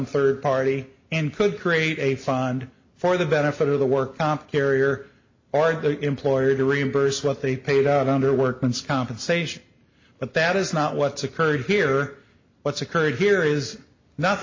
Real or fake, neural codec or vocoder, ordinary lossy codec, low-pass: real; none; MP3, 32 kbps; 7.2 kHz